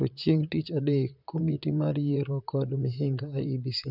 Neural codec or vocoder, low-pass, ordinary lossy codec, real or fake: vocoder, 44.1 kHz, 128 mel bands, Pupu-Vocoder; 5.4 kHz; none; fake